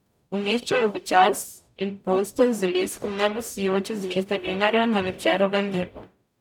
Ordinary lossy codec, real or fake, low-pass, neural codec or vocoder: none; fake; 19.8 kHz; codec, 44.1 kHz, 0.9 kbps, DAC